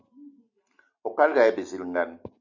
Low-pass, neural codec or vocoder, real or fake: 7.2 kHz; none; real